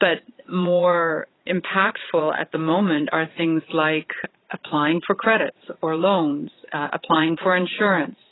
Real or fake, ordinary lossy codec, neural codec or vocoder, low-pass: fake; AAC, 16 kbps; vocoder, 22.05 kHz, 80 mel bands, Vocos; 7.2 kHz